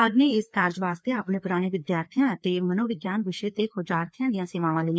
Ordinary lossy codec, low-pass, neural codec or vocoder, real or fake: none; none; codec, 16 kHz, 2 kbps, FreqCodec, larger model; fake